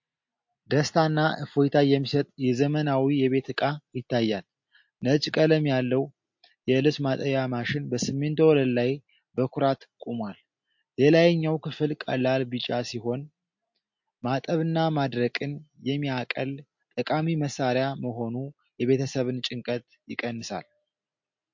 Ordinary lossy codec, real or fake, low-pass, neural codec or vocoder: MP3, 48 kbps; real; 7.2 kHz; none